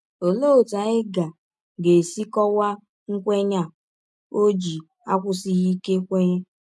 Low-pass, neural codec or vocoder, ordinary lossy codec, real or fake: none; none; none; real